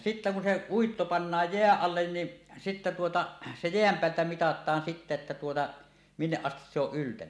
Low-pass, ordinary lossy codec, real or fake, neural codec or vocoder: none; none; real; none